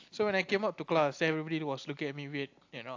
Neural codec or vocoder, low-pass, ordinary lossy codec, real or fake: none; 7.2 kHz; AAC, 48 kbps; real